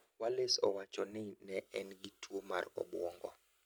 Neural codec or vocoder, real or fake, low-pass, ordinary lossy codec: none; real; none; none